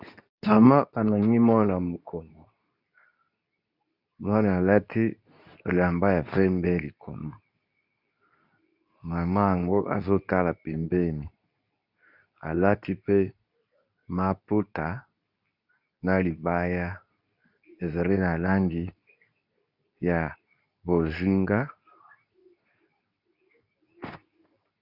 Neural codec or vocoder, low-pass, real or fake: codec, 24 kHz, 0.9 kbps, WavTokenizer, medium speech release version 2; 5.4 kHz; fake